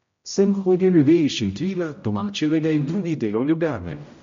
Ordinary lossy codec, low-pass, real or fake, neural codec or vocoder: MP3, 48 kbps; 7.2 kHz; fake; codec, 16 kHz, 0.5 kbps, X-Codec, HuBERT features, trained on general audio